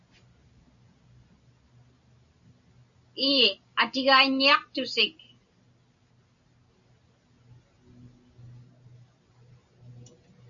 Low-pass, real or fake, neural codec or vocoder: 7.2 kHz; real; none